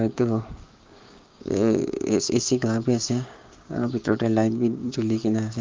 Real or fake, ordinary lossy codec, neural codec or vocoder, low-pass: fake; Opus, 32 kbps; codec, 16 kHz, 6 kbps, DAC; 7.2 kHz